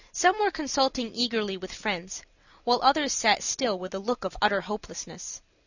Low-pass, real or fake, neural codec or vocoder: 7.2 kHz; real; none